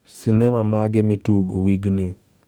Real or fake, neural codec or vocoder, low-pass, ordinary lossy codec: fake; codec, 44.1 kHz, 2.6 kbps, DAC; none; none